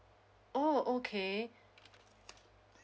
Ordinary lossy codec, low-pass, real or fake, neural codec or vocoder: none; none; real; none